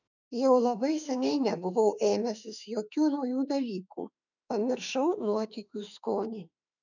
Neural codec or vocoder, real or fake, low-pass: autoencoder, 48 kHz, 32 numbers a frame, DAC-VAE, trained on Japanese speech; fake; 7.2 kHz